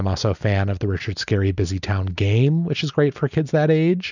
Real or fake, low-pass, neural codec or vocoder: real; 7.2 kHz; none